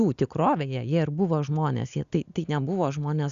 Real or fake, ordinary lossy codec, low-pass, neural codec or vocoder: real; Opus, 64 kbps; 7.2 kHz; none